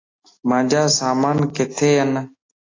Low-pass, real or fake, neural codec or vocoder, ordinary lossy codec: 7.2 kHz; real; none; AAC, 32 kbps